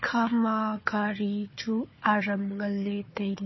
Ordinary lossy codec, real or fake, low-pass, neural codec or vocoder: MP3, 24 kbps; fake; 7.2 kHz; codec, 16 kHz, 4 kbps, FunCodec, trained on Chinese and English, 50 frames a second